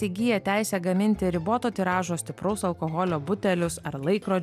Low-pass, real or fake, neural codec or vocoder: 14.4 kHz; real; none